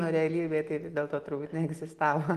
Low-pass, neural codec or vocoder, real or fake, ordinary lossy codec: 14.4 kHz; none; real; Opus, 24 kbps